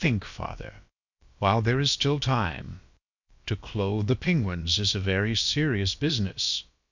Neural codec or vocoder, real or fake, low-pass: codec, 16 kHz, about 1 kbps, DyCAST, with the encoder's durations; fake; 7.2 kHz